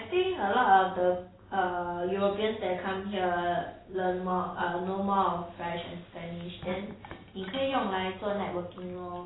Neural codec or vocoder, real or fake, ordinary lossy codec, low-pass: none; real; AAC, 16 kbps; 7.2 kHz